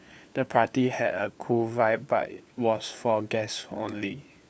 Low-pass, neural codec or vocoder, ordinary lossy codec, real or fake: none; codec, 16 kHz, 4 kbps, FunCodec, trained on LibriTTS, 50 frames a second; none; fake